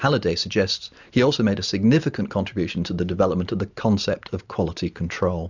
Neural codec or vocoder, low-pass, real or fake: none; 7.2 kHz; real